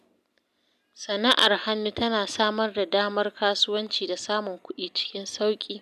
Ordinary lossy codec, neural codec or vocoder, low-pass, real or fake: none; none; 14.4 kHz; real